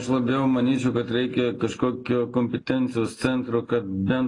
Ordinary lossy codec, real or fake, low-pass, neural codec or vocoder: AAC, 32 kbps; real; 10.8 kHz; none